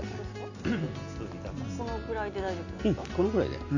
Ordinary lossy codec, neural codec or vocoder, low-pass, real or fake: none; none; 7.2 kHz; real